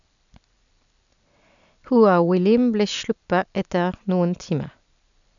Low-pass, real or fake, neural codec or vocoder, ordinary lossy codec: 7.2 kHz; real; none; none